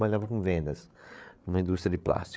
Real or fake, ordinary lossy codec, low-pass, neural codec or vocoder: fake; none; none; codec, 16 kHz, 8 kbps, FreqCodec, larger model